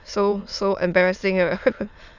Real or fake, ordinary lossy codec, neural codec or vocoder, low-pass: fake; none; autoencoder, 22.05 kHz, a latent of 192 numbers a frame, VITS, trained on many speakers; 7.2 kHz